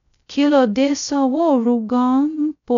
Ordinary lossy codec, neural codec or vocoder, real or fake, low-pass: none; codec, 16 kHz, 0.2 kbps, FocalCodec; fake; 7.2 kHz